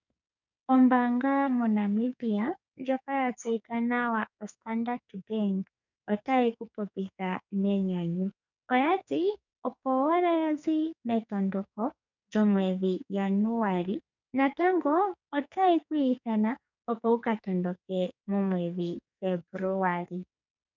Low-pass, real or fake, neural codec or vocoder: 7.2 kHz; fake; codec, 44.1 kHz, 2.6 kbps, SNAC